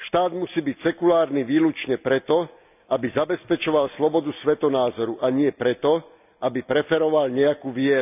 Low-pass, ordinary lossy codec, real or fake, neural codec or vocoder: 3.6 kHz; none; real; none